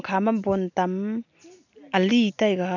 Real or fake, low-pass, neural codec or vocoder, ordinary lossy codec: real; 7.2 kHz; none; none